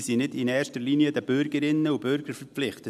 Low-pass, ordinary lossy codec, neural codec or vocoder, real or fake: 14.4 kHz; none; none; real